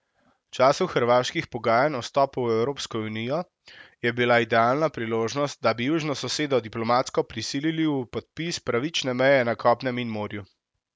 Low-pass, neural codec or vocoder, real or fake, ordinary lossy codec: none; none; real; none